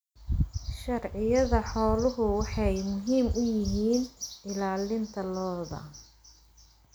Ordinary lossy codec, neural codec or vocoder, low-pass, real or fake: none; none; none; real